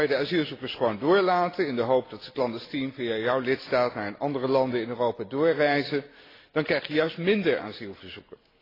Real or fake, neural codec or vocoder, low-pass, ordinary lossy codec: real; none; 5.4 kHz; AAC, 24 kbps